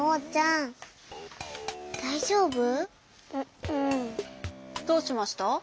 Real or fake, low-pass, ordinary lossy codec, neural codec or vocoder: real; none; none; none